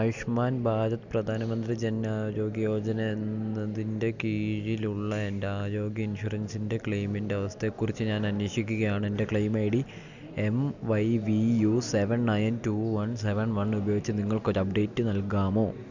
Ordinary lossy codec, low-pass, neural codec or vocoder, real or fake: none; 7.2 kHz; none; real